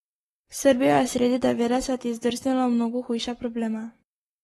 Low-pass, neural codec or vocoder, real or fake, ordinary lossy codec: 19.8 kHz; codec, 44.1 kHz, 7.8 kbps, Pupu-Codec; fake; AAC, 32 kbps